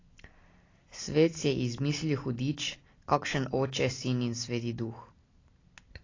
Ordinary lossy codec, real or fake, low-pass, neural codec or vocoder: AAC, 32 kbps; real; 7.2 kHz; none